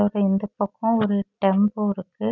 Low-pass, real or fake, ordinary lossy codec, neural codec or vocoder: 7.2 kHz; real; none; none